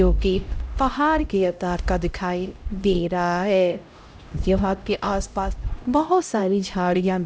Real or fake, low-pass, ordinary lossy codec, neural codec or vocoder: fake; none; none; codec, 16 kHz, 0.5 kbps, X-Codec, HuBERT features, trained on LibriSpeech